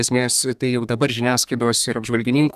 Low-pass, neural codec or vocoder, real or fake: 14.4 kHz; codec, 32 kHz, 1.9 kbps, SNAC; fake